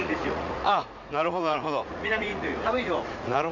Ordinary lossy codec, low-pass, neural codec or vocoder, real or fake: none; 7.2 kHz; vocoder, 44.1 kHz, 80 mel bands, Vocos; fake